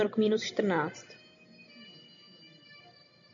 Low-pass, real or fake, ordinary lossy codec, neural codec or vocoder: 7.2 kHz; real; MP3, 48 kbps; none